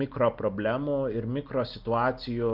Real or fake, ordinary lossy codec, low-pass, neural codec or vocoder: real; Opus, 32 kbps; 5.4 kHz; none